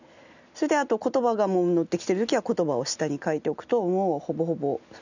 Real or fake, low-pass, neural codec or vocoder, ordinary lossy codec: real; 7.2 kHz; none; none